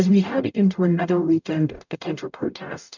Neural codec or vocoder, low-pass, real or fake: codec, 44.1 kHz, 0.9 kbps, DAC; 7.2 kHz; fake